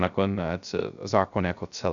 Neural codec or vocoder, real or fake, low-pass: codec, 16 kHz, 0.3 kbps, FocalCodec; fake; 7.2 kHz